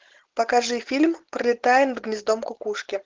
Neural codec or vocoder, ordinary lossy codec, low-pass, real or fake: none; Opus, 16 kbps; 7.2 kHz; real